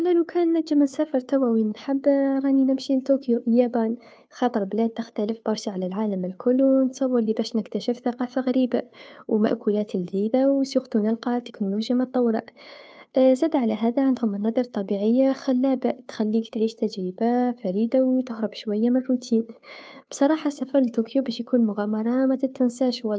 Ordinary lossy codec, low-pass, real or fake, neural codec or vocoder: none; none; fake; codec, 16 kHz, 2 kbps, FunCodec, trained on Chinese and English, 25 frames a second